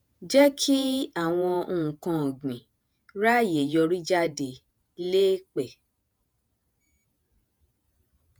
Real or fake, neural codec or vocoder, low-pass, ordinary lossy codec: fake; vocoder, 48 kHz, 128 mel bands, Vocos; none; none